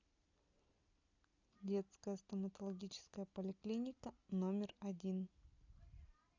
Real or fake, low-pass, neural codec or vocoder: real; 7.2 kHz; none